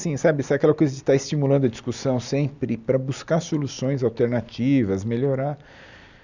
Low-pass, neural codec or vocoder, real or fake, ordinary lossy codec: 7.2 kHz; none; real; none